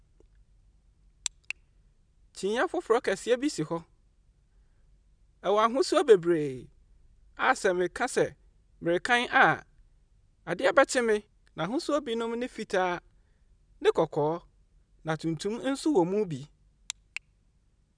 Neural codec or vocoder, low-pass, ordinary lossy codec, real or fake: none; 9.9 kHz; none; real